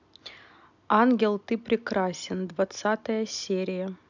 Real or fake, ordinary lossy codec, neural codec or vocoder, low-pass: real; none; none; 7.2 kHz